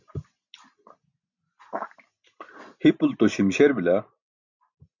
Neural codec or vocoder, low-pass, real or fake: none; 7.2 kHz; real